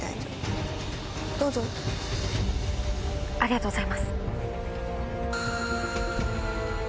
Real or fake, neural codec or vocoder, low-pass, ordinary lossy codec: real; none; none; none